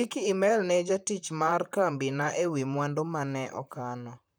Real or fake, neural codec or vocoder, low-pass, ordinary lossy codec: fake; vocoder, 44.1 kHz, 128 mel bands, Pupu-Vocoder; none; none